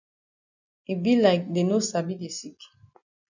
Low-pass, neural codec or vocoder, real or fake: 7.2 kHz; none; real